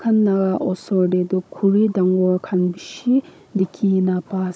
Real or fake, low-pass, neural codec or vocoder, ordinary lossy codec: fake; none; codec, 16 kHz, 16 kbps, FunCodec, trained on Chinese and English, 50 frames a second; none